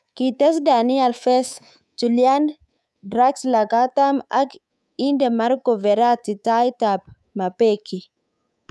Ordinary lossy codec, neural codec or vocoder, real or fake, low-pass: none; codec, 24 kHz, 3.1 kbps, DualCodec; fake; none